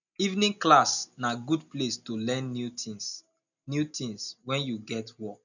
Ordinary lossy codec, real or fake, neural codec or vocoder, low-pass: none; real; none; 7.2 kHz